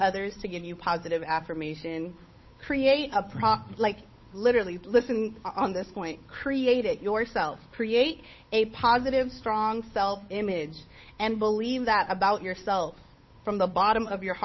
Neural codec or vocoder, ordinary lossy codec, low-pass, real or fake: codec, 16 kHz, 8 kbps, FunCodec, trained on Chinese and English, 25 frames a second; MP3, 24 kbps; 7.2 kHz; fake